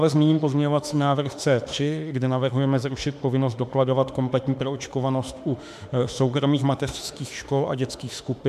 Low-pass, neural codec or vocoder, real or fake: 14.4 kHz; autoencoder, 48 kHz, 32 numbers a frame, DAC-VAE, trained on Japanese speech; fake